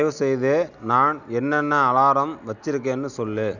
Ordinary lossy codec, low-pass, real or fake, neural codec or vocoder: none; 7.2 kHz; real; none